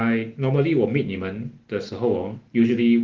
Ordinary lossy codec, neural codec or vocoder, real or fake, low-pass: Opus, 16 kbps; none; real; 7.2 kHz